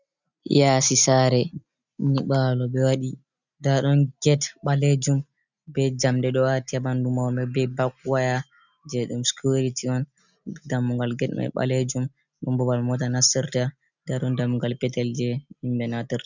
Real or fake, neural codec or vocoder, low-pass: real; none; 7.2 kHz